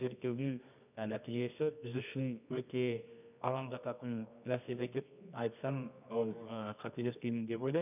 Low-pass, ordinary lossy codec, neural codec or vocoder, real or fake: 3.6 kHz; none; codec, 24 kHz, 0.9 kbps, WavTokenizer, medium music audio release; fake